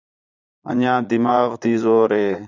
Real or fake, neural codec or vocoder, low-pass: fake; vocoder, 22.05 kHz, 80 mel bands, Vocos; 7.2 kHz